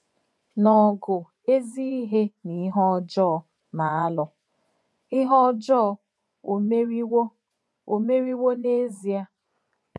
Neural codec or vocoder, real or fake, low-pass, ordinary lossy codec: vocoder, 24 kHz, 100 mel bands, Vocos; fake; none; none